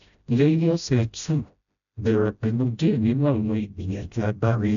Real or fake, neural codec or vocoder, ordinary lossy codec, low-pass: fake; codec, 16 kHz, 0.5 kbps, FreqCodec, smaller model; none; 7.2 kHz